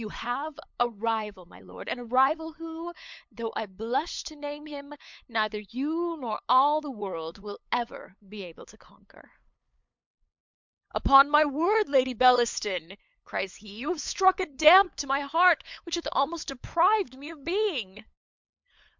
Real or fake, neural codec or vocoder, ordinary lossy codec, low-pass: fake; codec, 16 kHz, 8 kbps, FunCodec, trained on LibriTTS, 25 frames a second; MP3, 64 kbps; 7.2 kHz